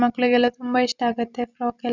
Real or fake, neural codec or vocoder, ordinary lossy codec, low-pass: real; none; none; 7.2 kHz